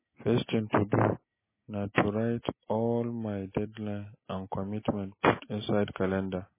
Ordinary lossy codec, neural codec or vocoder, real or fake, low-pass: MP3, 16 kbps; none; real; 3.6 kHz